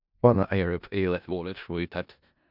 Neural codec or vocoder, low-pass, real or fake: codec, 16 kHz in and 24 kHz out, 0.4 kbps, LongCat-Audio-Codec, four codebook decoder; 5.4 kHz; fake